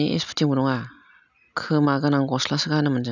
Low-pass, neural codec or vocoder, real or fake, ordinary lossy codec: 7.2 kHz; none; real; none